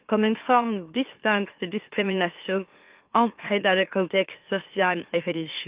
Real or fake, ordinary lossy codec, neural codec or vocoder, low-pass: fake; Opus, 24 kbps; autoencoder, 44.1 kHz, a latent of 192 numbers a frame, MeloTTS; 3.6 kHz